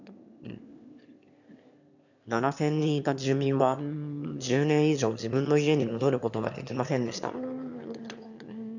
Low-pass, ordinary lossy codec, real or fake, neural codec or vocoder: 7.2 kHz; none; fake; autoencoder, 22.05 kHz, a latent of 192 numbers a frame, VITS, trained on one speaker